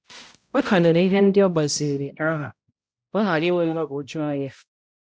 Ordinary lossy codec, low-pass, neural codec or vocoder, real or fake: none; none; codec, 16 kHz, 0.5 kbps, X-Codec, HuBERT features, trained on balanced general audio; fake